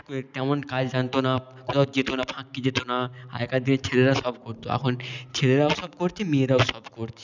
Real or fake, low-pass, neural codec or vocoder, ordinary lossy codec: real; 7.2 kHz; none; none